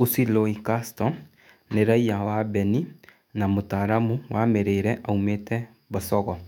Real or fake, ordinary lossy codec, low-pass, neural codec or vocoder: fake; none; 19.8 kHz; autoencoder, 48 kHz, 128 numbers a frame, DAC-VAE, trained on Japanese speech